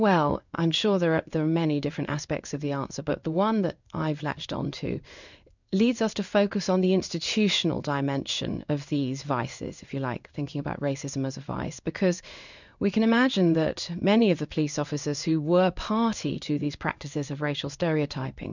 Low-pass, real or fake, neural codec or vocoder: 7.2 kHz; fake; codec, 16 kHz in and 24 kHz out, 1 kbps, XY-Tokenizer